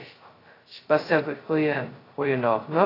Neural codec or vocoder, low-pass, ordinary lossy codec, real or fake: codec, 16 kHz, 0.2 kbps, FocalCodec; 5.4 kHz; AAC, 24 kbps; fake